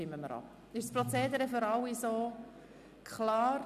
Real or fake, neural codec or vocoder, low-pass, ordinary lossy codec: real; none; 14.4 kHz; none